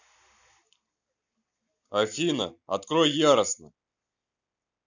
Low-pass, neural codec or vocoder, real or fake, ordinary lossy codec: 7.2 kHz; none; real; none